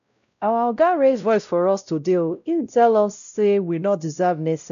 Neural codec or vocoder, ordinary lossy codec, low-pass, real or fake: codec, 16 kHz, 0.5 kbps, X-Codec, WavLM features, trained on Multilingual LibriSpeech; none; 7.2 kHz; fake